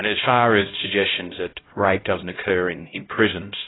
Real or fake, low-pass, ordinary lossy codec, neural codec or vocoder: fake; 7.2 kHz; AAC, 16 kbps; codec, 16 kHz, 0.5 kbps, X-Codec, HuBERT features, trained on LibriSpeech